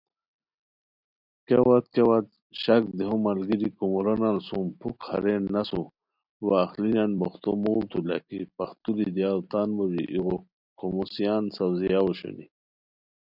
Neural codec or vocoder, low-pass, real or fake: none; 5.4 kHz; real